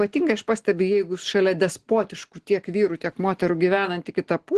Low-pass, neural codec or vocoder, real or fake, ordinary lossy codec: 10.8 kHz; none; real; Opus, 16 kbps